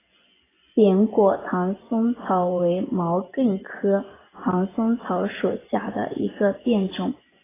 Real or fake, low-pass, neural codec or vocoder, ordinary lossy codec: fake; 3.6 kHz; vocoder, 24 kHz, 100 mel bands, Vocos; AAC, 16 kbps